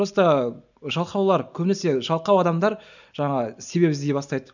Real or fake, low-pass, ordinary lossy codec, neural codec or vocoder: real; 7.2 kHz; none; none